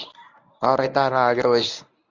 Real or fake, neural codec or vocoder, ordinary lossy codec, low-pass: fake; codec, 24 kHz, 0.9 kbps, WavTokenizer, medium speech release version 1; Opus, 64 kbps; 7.2 kHz